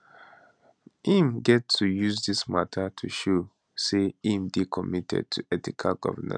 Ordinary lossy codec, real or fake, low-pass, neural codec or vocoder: none; real; 9.9 kHz; none